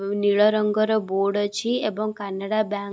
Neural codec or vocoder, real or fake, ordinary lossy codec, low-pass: none; real; none; none